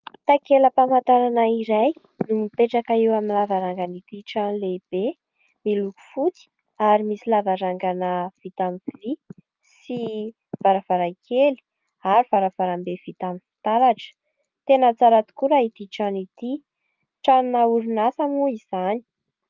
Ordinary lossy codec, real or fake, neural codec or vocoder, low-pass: Opus, 32 kbps; real; none; 7.2 kHz